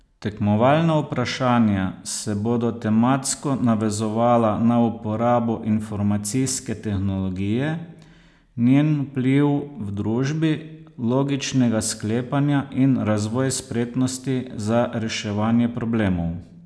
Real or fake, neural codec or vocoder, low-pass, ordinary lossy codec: real; none; none; none